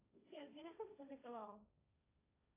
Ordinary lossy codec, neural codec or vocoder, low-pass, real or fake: AAC, 24 kbps; codec, 16 kHz, 1.1 kbps, Voila-Tokenizer; 3.6 kHz; fake